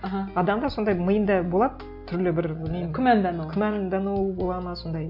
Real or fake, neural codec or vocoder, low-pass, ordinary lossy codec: real; none; 5.4 kHz; none